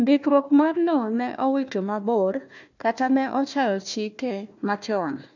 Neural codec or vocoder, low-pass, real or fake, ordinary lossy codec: codec, 16 kHz, 1 kbps, FunCodec, trained on Chinese and English, 50 frames a second; 7.2 kHz; fake; none